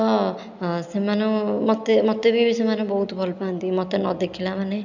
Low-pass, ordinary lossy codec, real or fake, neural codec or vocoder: 7.2 kHz; none; real; none